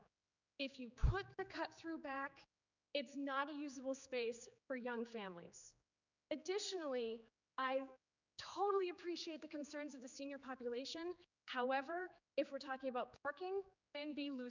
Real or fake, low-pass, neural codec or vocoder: fake; 7.2 kHz; codec, 16 kHz, 4 kbps, X-Codec, HuBERT features, trained on general audio